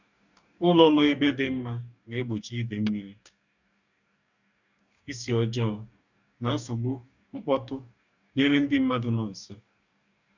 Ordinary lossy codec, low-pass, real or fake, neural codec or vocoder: none; 7.2 kHz; fake; codec, 44.1 kHz, 2.6 kbps, DAC